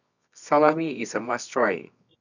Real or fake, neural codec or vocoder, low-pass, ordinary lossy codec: fake; codec, 24 kHz, 0.9 kbps, WavTokenizer, medium music audio release; 7.2 kHz; none